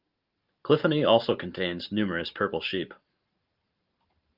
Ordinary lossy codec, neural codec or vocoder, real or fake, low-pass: Opus, 32 kbps; vocoder, 44.1 kHz, 128 mel bands every 512 samples, BigVGAN v2; fake; 5.4 kHz